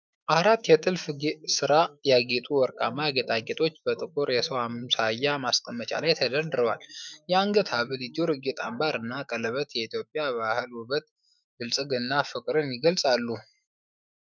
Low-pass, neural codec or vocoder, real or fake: 7.2 kHz; autoencoder, 48 kHz, 128 numbers a frame, DAC-VAE, trained on Japanese speech; fake